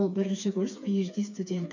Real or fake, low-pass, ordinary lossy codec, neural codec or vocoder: fake; 7.2 kHz; none; codec, 16 kHz, 4 kbps, FreqCodec, smaller model